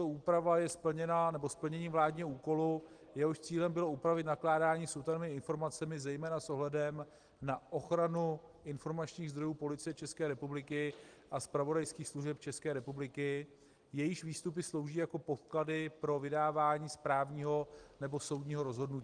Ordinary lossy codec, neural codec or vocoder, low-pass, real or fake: Opus, 24 kbps; none; 9.9 kHz; real